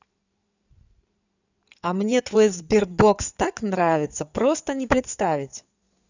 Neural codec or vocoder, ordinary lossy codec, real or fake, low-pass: codec, 16 kHz in and 24 kHz out, 2.2 kbps, FireRedTTS-2 codec; none; fake; 7.2 kHz